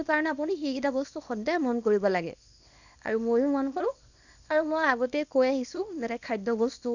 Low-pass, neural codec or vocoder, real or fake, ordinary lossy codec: 7.2 kHz; codec, 24 kHz, 0.9 kbps, WavTokenizer, small release; fake; none